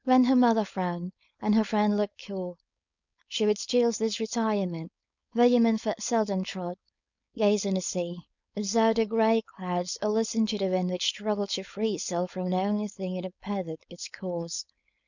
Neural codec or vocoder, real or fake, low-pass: codec, 16 kHz, 4.8 kbps, FACodec; fake; 7.2 kHz